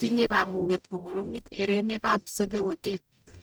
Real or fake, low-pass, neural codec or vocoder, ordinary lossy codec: fake; none; codec, 44.1 kHz, 0.9 kbps, DAC; none